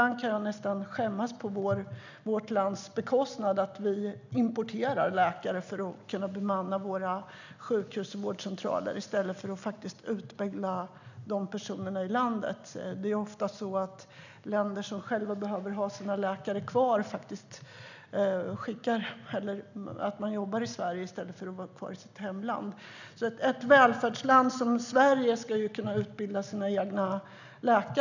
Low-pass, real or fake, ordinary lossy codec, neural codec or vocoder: 7.2 kHz; real; none; none